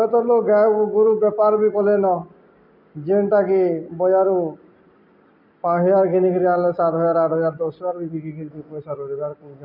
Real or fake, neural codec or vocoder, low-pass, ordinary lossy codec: real; none; 5.4 kHz; none